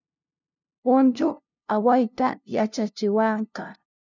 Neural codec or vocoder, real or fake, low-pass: codec, 16 kHz, 0.5 kbps, FunCodec, trained on LibriTTS, 25 frames a second; fake; 7.2 kHz